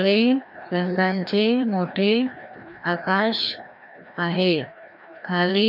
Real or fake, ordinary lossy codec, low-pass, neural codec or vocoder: fake; none; 5.4 kHz; codec, 16 kHz, 1 kbps, FreqCodec, larger model